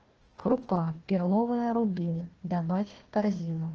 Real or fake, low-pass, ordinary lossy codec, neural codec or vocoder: fake; 7.2 kHz; Opus, 16 kbps; codec, 16 kHz, 1 kbps, FunCodec, trained on Chinese and English, 50 frames a second